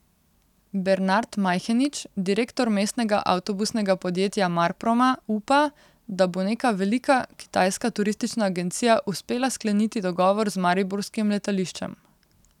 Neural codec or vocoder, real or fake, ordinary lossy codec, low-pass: none; real; none; 19.8 kHz